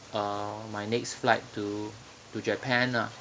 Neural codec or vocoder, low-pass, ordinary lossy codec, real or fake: none; none; none; real